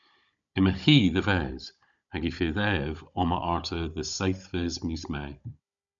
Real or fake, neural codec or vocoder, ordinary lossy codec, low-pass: fake; codec, 16 kHz, 16 kbps, FunCodec, trained on Chinese and English, 50 frames a second; MP3, 64 kbps; 7.2 kHz